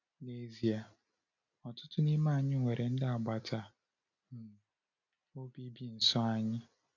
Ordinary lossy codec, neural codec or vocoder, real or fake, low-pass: none; none; real; 7.2 kHz